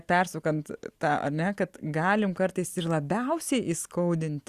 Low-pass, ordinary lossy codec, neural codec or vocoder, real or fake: 14.4 kHz; Opus, 64 kbps; none; real